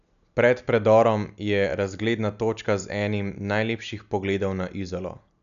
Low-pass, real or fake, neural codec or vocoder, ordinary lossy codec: 7.2 kHz; real; none; none